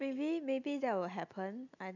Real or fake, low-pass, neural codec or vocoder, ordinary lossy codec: real; 7.2 kHz; none; none